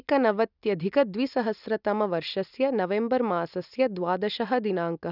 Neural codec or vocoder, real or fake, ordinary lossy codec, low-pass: none; real; none; 5.4 kHz